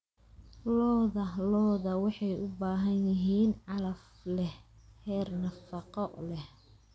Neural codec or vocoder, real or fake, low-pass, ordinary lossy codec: none; real; none; none